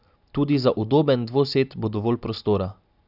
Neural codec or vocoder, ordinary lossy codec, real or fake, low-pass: none; none; real; 5.4 kHz